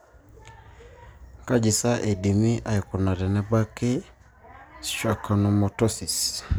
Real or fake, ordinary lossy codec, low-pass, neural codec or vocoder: real; none; none; none